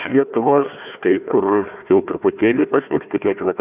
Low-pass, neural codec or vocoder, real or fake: 3.6 kHz; codec, 16 kHz, 1 kbps, FunCodec, trained on Chinese and English, 50 frames a second; fake